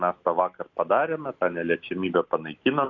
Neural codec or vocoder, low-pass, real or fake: none; 7.2 kHz; real